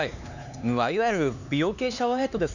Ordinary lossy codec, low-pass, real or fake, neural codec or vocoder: none; 7.2 kHz; fake; codec, 16 kHz, 4 kbps, X-Codec, HuBERT features, trained on LibriSpeech